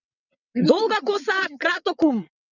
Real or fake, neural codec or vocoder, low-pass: fake; vocoder, 22.05 kHz, 80 mel bands, WaveNeXt; 7.2 kHz